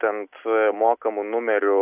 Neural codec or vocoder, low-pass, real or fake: none; 3.6 kHz; real